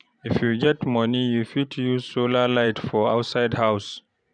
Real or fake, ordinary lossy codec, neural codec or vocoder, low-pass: fake; none; vocoder, 44.1 kHz, 128 mel bands every 512 samples, BigVGAN v2; 9.9 kHz